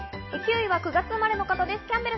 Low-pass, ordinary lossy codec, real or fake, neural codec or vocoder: 7.2 kHz; MP3, 24 kbps; real; none